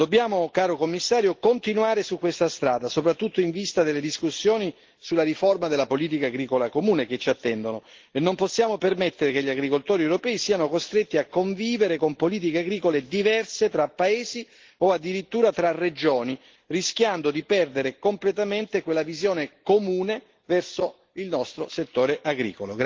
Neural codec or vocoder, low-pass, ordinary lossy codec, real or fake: none; 7.2 kHz; Opus, 16 kbps; real